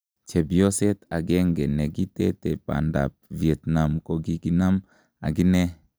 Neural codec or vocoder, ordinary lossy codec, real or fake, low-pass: none; none; real; none